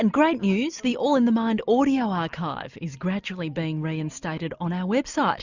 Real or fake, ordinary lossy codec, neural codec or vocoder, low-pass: real; Opus, 64 kbps; none; 7.2 kHz